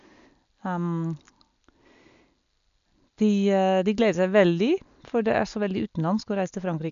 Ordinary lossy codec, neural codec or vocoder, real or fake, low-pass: none; none; real; 7.2 kHz